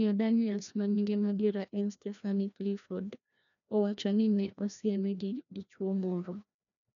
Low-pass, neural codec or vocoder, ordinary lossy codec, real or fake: 7.2 kHz; codec, 16 kHz, 1 kbps, FreqCodec, larger model; none; fake